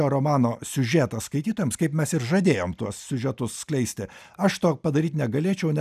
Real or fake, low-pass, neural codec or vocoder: real; 14.4 kHz; none